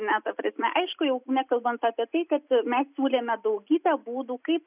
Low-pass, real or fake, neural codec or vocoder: 3.6 kHz; real; none